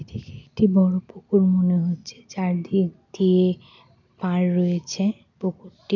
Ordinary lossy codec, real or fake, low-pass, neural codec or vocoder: none; real; 7.2 kHz; none